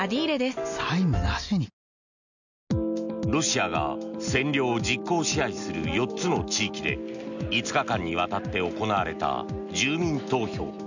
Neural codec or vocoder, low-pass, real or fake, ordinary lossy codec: none; 7.2 kHz; real; none